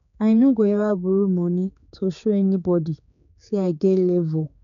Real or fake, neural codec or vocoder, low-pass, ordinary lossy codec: fake; codec, 16 kHz, 4 kbps, X-Codec, HuBERT features, trained on general audio; 7.2 kHz; none